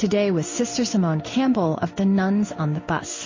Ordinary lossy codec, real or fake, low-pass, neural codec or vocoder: MP3, 32 kbps; fake; 7.2 kHz; codec, 16 kHz in and 24 kHz out, 1 kbps, XY-Tokenizer